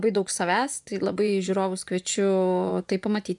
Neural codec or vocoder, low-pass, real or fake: vocoder, 24 kHz, 100 mel bands, Vocos; 10.8 kHz; fake